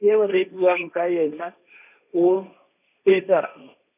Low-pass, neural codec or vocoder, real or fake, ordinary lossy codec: 3.6 kHz; codec, 16 kHz, 1.1 kbps, Voila-Tokenizer; fake; none